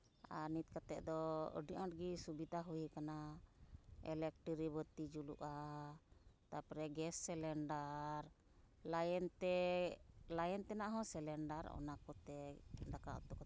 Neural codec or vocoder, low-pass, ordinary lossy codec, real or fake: none; none; none; real